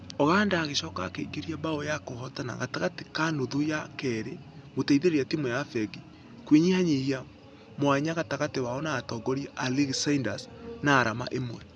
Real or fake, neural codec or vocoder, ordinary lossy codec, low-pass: real; none; none; none